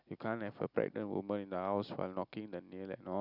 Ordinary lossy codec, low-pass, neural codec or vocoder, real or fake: none; 5.4 kHz; none; real